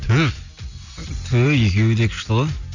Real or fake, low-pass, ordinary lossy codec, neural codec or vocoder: real; 7.2 kHz; none; none